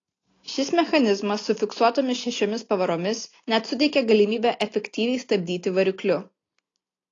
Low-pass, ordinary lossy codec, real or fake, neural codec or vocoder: 7.2 kHz; AAC, 32 kbps; real; none